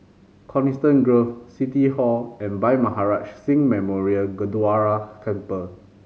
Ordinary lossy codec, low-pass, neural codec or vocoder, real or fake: none; none; none; real